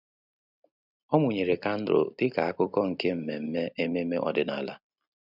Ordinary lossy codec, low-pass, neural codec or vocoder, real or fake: none; 5.4 kHz; none; real